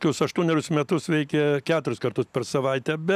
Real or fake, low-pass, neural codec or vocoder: real; 14.4 kHz; none